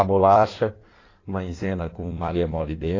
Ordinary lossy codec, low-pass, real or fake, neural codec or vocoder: AAC, 32 kbps; 7.2 kHz; fake; codec, 16 kHz in and 24 kHz out, 1.1 kbps, FireRedTTS-2 codec